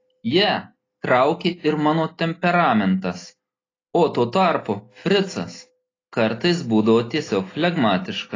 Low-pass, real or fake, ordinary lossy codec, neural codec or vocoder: 7.2 kHz; real; AAC, 32 kbps; none